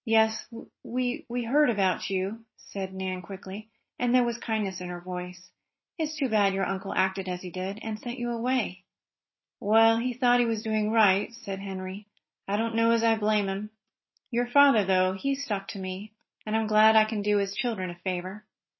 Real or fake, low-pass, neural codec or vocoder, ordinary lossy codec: real; 7.2 kHz; none; MP3, 24 kbps